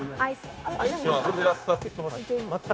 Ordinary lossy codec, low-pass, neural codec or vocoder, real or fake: none; none; codec, 16 kHz, 0.9 kbps, LongCat-Audio-Codec; fake